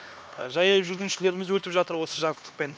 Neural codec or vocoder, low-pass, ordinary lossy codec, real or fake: codec, 16 kHz, 2 kbps, X-Codec, HuBERT features, trained on LibriSpeech; none; none; fake